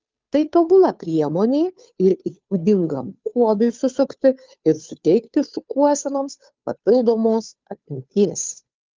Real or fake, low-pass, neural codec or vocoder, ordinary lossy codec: fake; 7.2 kHz; codec, 16 kHz, 2 kbps, FunCodec, trained on Chinese and English, 25 frames a second; Opus, 24 kbps